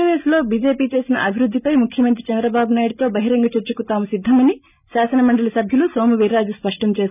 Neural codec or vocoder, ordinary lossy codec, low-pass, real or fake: none; none; 3.6 kHz; real